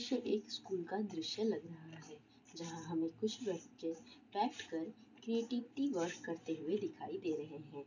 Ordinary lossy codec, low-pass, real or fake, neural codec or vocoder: none; 7.2 kHz; real; none